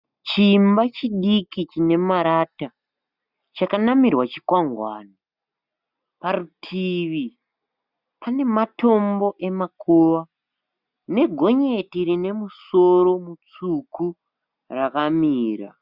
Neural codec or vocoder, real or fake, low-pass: none; real; 5.4 kHz